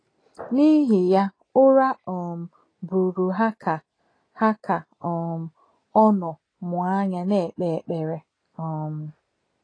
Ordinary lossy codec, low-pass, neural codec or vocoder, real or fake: AAC, 32 kbps; 9.9 kHz; none; real